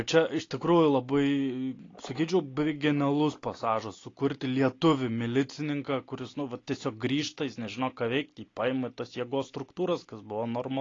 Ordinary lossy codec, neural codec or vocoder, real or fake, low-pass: AAC, 32 kbps; none; real; 7.2 kHz